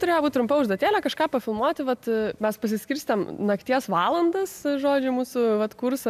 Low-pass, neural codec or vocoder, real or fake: 14.4 kHz; none; real